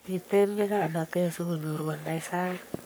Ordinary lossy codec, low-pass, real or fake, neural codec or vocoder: none; none; fake; codec, 44.1 kHz, 3.4 kbps, Pupu-Codec